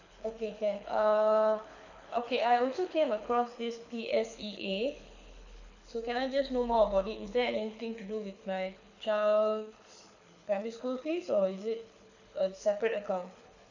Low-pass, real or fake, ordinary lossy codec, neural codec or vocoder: 7.2 kHz; fake; none; codec, 24 kHz, 6 kbps, HILCodec